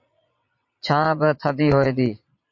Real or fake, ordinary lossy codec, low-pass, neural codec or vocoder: fake; MP3, 48 kbps; 7.2 kHz; vocoder, 22.05 kHz, 80 mel bands, Vocos